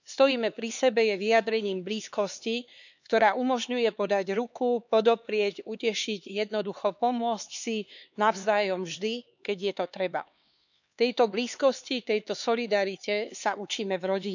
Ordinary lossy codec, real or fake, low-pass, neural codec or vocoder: none; fake; 7.2 kHz; codec, 16 kHz, 4 kbps, X-Codec, HuBERT features, trained on LibriSpeech